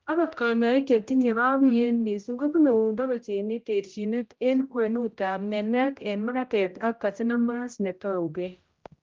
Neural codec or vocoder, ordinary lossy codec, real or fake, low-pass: codec, 16 kHz, 0.5 kbps, X-Codec, HuBERT features, trained on general audio; Opus, 32 kbps; fake; 7.2 kHz